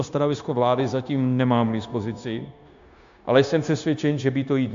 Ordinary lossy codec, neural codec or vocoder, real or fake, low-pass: AAC, 64 kbps; codec, 16 kHz, 0.9 kbps, LongCat-Audio-Codec; fake; 7.2 kHz